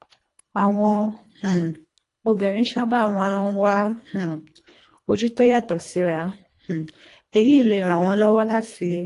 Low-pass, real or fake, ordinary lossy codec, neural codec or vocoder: 10.8 kHz; fake; AAC, 48 kbps; codec, 24 kHz, 1.5 kbps, HILCodec